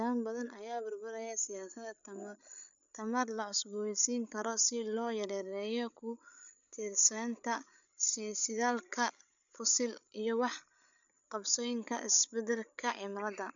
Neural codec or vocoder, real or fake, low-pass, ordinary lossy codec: codec, 16 kHz, 8 kbps, FreqCodec, larger model; fake; 7.2 kHz; none